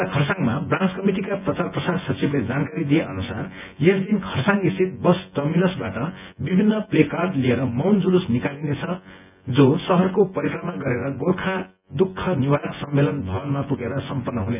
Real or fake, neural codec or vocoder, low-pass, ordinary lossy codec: fake; vocoder, 24 kHz, 100 mel bands, Vocos; 3.6 kHz; none